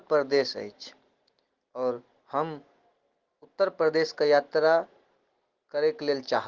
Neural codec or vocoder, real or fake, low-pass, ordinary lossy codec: none; real; 7.2 kHz; Opus, 16 kbps